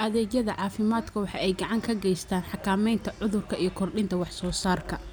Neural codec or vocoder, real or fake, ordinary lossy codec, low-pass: none; real; none; none